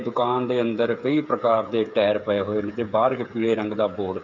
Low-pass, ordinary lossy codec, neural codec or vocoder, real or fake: 7.2 kHz; none; codec, 16 kHz, 8 kbps, FreqCodec, smaller model; fake